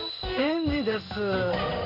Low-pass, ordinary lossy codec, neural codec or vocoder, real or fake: 5.4 kHz; none; codec, 16 kHz in and 24 kHz out, 1 kbps, XY-Tokenizer; fake